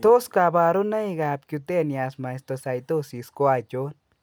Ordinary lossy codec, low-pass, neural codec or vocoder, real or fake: none; none; none; real